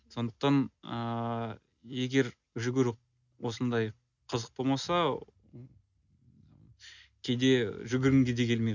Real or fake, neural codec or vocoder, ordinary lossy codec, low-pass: real; none; none; 7.2 kHz